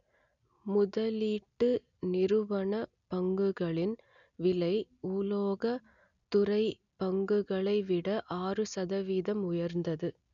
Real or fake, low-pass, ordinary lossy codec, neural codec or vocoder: real; 7.2 kHz; none; none